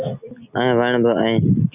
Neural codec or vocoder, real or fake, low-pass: none; real; 3.6 kHz